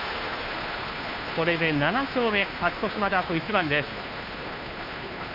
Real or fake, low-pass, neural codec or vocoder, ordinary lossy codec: fake; 5.4 kHz; codec, 16 kHz, 2 kbps, FunCodec, trained on Chinese and English, 25 frames a second; none